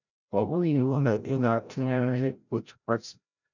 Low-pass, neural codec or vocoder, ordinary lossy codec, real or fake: 7.2 kHz; codec, 16 kHz, 0.5 kbps, FreqCodec, larger model; none; fake